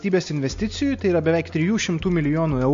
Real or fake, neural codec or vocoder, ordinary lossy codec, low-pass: real; none; MP3, 48 kbps; 7.2 kHz